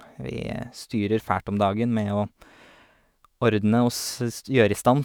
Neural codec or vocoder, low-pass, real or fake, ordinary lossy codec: autoencoder, 48 kHz, 128 numbers a frame, DAC-VAE, trained on Japanese speech; none; fake; none